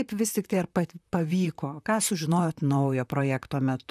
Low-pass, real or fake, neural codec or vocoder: 14.4 kHz; fake; vocoder, 44.1 kHz, 128 mel bands, Pupu-Vocoder